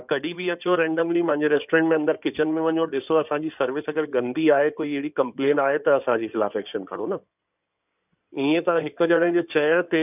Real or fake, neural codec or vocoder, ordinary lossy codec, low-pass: fake; codec, 16 kHz in and 24 kHz out, 2.2 kbps, FireRedTTS-2 codec; none; 3.6 kHz